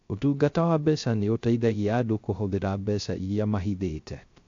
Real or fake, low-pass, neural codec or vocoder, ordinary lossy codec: fake; 7.2 kHz; codec, 16 kHz, 0.3 kbps, FocalCodec; AAC, 48 kbps